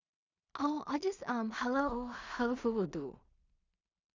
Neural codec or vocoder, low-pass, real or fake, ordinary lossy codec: codec, 16 kHz in and 24 kHz out, 0.4 kbps, LongCat-Audio-Codec, two codebook decoder; 7.2 kHz; fake; Opus, 64 kbps